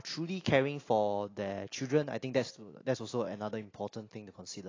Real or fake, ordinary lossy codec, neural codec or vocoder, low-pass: real; AAC, 32 kbps; none; 7.2 kHz